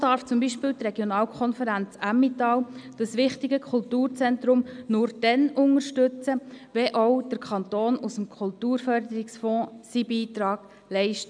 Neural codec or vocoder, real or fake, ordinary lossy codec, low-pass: none; real; none; 9.9 kHz